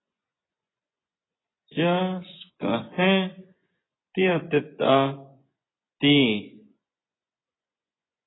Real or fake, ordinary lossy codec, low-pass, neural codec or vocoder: fake; AAC, 16 kbps; 7.2 kHz; vocoder, 24 kHz, 100 mel bands, Vocos